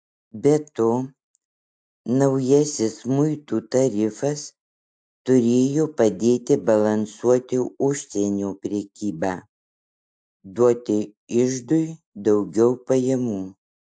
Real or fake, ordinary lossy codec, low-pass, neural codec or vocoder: real; AAC, 64 kbps; 9.9 kHz; none